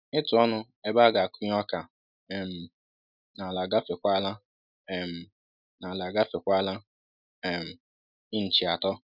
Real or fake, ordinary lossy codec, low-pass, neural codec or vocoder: real; none; 5.4 kHz; none